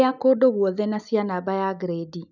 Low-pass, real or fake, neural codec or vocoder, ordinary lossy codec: 7.2 kHz; real; none; none